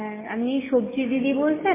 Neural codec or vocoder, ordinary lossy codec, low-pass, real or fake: none; MP3, 16 kbps; 3.6 kHz; real